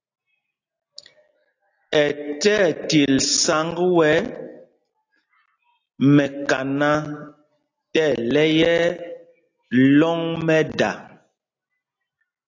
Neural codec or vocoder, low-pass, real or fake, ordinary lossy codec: none; 7.2 kHz; real; AAC, 48 kbps